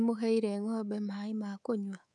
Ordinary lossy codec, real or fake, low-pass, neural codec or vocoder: none; fake; none; codec, 24 kHz, 3.1 kbps, DualCodec